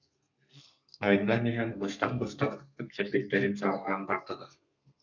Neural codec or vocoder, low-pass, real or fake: codec, 32 kHz, 1.9 kbps, SNAC; 7.2 kHz; fake